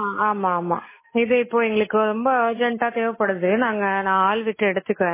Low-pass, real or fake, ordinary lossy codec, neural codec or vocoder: 3.6 kHz; real; MP3, 16 kbps; none